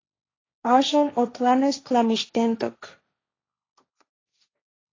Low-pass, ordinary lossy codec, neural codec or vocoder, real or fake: 7.2 kHz; AAC, 32 kbps; codec, 16 kHz, 1.1 kbps, Voila-Tokenizer; fake